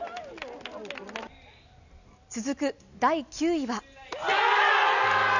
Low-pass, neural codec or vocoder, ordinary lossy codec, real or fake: 7.2 kHz; none; none; real